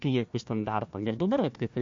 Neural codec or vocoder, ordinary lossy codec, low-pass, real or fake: codec, 16 kHz, 1 kbps, FunCodec, trained on Chinese and English, 50 frames a second; MP3, 48 kbps; 7.2 kHz; fake